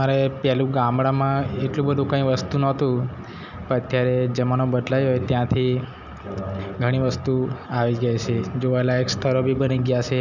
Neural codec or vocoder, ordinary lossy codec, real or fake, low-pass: none; none; real; 7.2 kHz